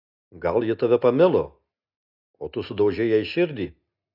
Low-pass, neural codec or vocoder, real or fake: 5.4 kHz; none; real